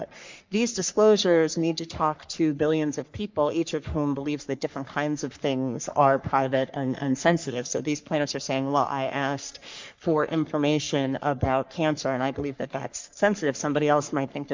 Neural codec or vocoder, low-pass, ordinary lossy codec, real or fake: codec, 44.1 kHz, 3.4 kbps, Pupu-Codec; 7.2 kHz; MP3, 64 kbps; fake